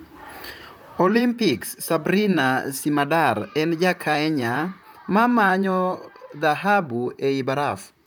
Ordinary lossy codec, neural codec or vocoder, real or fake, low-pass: none; vocoder, 44.1 kHz, 128 mel bands, Pupu-Vocoder; fake; none